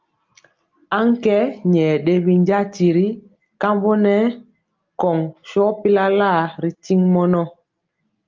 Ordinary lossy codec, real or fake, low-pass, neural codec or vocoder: Opus, 24 kbps; real; 7.2 kHz; none